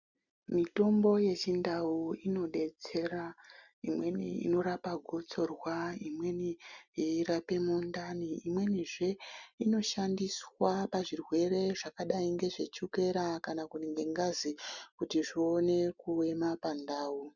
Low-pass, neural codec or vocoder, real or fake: 7.2 kHz; none; real